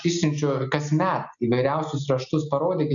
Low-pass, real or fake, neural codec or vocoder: 7.2 kHz; real; none